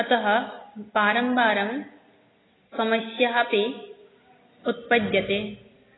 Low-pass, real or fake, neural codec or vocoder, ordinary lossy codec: 7.2 kHz; real; none; AAC, 16 kbps